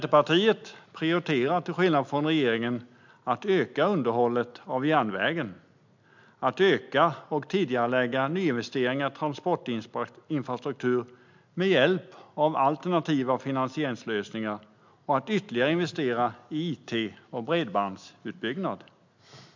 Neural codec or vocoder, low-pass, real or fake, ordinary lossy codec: none; 7.2 kHz; real; MP3, 64 kbps